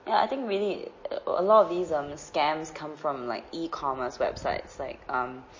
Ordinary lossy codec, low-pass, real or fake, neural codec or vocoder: MP3, 32 kbps; 7.2 kHz; real; none